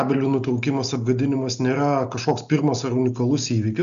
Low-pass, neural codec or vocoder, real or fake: 7.2 kHz; none; real